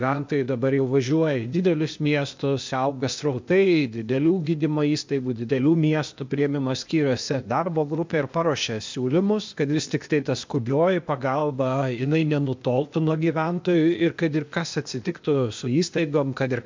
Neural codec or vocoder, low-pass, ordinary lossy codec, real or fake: codec, 16 kHz, 0.8 kbps, ZipCodec; 7.2 kHz; MP3, 64 kbps; fake